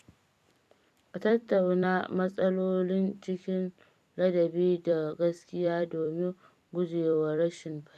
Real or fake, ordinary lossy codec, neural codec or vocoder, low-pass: fake; none; vocoder, 44.1 kHz, 128 mel bands every 256 samples, BigVGAN v2; 14.4 kHz